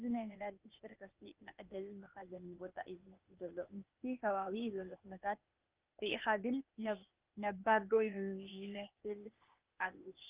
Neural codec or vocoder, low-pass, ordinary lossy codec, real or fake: codec, 16 kHz, 0.8 kbps, ZipCodec; 3.6 kHz; Opus, 32 kbps; fake